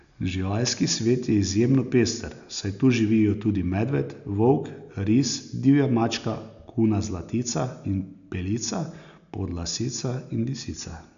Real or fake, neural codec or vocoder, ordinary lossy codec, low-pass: real; none; none; 7.2 kHz